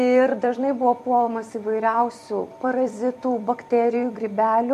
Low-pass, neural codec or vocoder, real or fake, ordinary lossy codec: 14.4 kHz; vocoder, 44.1 kHz, 128 mel bands every 256 samples, BigVGAN v2; fake; MP3, 64 kbps